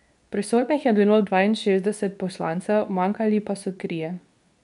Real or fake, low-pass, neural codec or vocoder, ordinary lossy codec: fake; 10.8 kHz; codec, 24 kHz, 0.9 kbps, WavTokenizer, medium speech release version 2; none